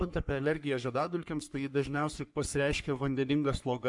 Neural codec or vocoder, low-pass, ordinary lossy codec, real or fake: codec, 44.1 kHz, 3.4 kbps, Pupu-Codec; 10.8 kHz; MP3, 96 kbps; fake